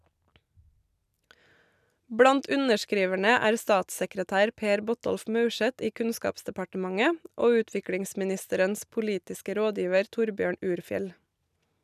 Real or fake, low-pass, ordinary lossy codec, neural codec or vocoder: real; 14.4 kHz; none; none